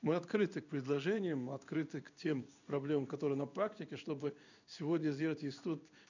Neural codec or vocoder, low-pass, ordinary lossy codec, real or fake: codec, 16 kHz in and 24 kHz out, 1 kbps, XY-Tokenizer; 7.2 kHz; none; fake